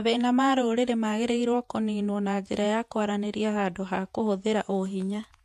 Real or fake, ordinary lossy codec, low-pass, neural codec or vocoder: fake; MP3, 64 kbps; 14.4 kHz; vocoder, 48 kHz, 128 mel bands, Vocos